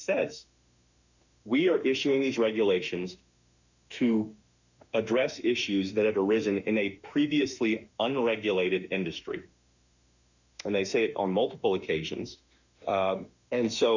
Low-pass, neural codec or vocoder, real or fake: 7.2 kHz; autoencoder, 48 kHz, 32 numbers a frame, DAC-VAE, trained on Japanese speech; fake